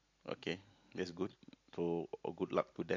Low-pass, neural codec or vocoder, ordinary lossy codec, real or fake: 7.2 kHz; none; MP3, 48 kbps; real